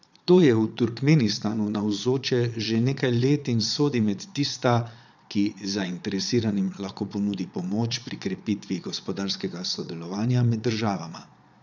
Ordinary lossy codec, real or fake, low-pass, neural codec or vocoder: none; fake; 7.2 kHz; vocoder, 22.05 kHz, 80 mel bands, Vocos